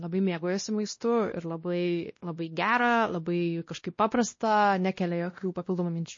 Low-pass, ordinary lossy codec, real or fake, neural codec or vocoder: 7.2 kHz; MP3, 32 kbps; fake; codec, 16 kHz, 1 kbps, X-Codec, WavLM features, trained on Multilingual LibriSpeech